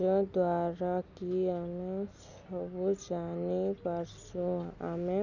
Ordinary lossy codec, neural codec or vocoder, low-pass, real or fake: none; none; 7.2 kHz; real